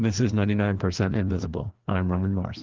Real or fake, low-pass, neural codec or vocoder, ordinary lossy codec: fake; 7.2 kHz; codec, 16 kHz, 2 kbps, FreqCodec, larger model; Opus, 16 kbps